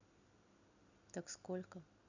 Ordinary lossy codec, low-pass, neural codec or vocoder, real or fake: none; 7.2 kHz; none; real